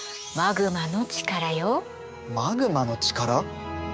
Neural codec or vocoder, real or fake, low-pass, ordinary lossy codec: codec, 16 kHz, 6 kbps, DAC; fake; none; none